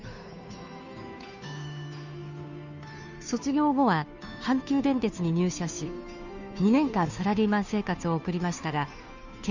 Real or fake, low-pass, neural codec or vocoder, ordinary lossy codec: fake; 7.2 kHz; codec, 16 kHz, 2 kbps, FunCodec, trained on Chinese and English, 25 frames a second; none